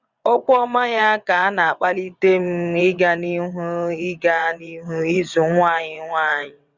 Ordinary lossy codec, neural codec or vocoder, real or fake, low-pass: Opus, 64 kbps; vocoder, 22.05 kHz, 80 mel bands, WaveNeXt; fake; 7.2 kHz